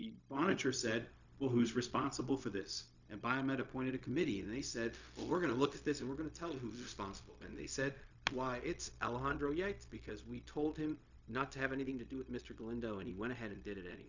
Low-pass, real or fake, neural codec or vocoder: 7.2 kHz; fake; codec, 16 kHz, 0.4 kbps, LongCat-Audio-Codec